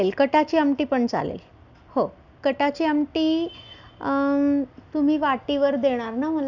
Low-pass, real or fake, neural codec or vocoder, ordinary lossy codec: 7.2 kHz; real; none; none